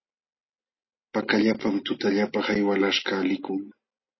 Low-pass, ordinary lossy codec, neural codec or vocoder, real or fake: 7.2 kHz; MP3, 24 kbps; none; real